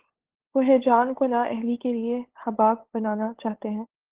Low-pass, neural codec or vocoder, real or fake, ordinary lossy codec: 3.6 kHz; codec, 16 kHz, 8 kbps, FunCodec, trained on LibriTTS, 25 frames a second; fake; Opus, 16 kbps